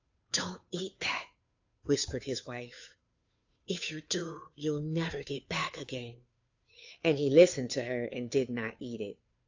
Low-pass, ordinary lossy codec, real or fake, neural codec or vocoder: 7.2 kHz; AAC, 48 kbps; fake; codec, 16 kHz, 2 kbps, FunCodec, trained on Chinese and English, 25 frames a second